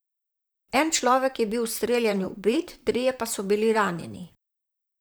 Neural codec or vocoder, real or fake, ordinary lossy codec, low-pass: vocoder, 44.1 kHz, 128 mel bands, Pupu-Vocoder; fake; none; none